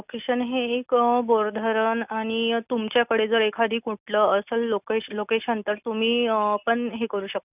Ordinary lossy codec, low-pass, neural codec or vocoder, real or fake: none; 3.6 kHz; none; real